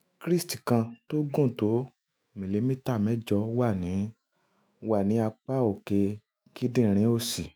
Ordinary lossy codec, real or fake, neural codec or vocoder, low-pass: none; fake; autoencoder, 48 kHz, 128 numbers a frame, DAC-VAE, trained on Japanese speech; none